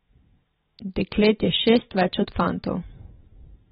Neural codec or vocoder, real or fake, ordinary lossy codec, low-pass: vocoder, 44.1 kHz, 128 mel bands every 512 samples, BigVGAN v2; fake; AAC, 16 kbps; 19.8 kHz